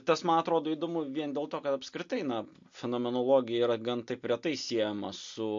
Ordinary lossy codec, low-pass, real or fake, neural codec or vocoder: MP3, 48 kbps; 7.2 kHz; real; none